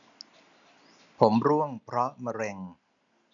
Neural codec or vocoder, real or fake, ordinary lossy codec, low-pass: none; real; none; 7.2 kHz